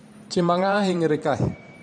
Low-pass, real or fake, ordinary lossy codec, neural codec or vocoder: 9.9 kHz; fake; none; vocoder, 44.1 kHz, 128 mel bands every 512 samples, BigVGAN v2